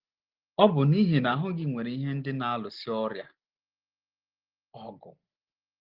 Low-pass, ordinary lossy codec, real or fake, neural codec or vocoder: 5.4 kHz; Opus, 16 kbps; real; none